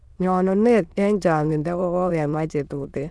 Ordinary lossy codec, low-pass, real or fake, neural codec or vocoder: none; none; fake; autoencoder, 22.05 kHz, a latent of 192 numbers a frame, VITS, trained on many speakers